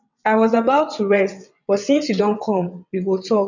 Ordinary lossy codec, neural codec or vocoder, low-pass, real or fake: none; vocoder, 22.05 kHz, 80 mel bands, WaveNeXt; 7.2 kHz; fake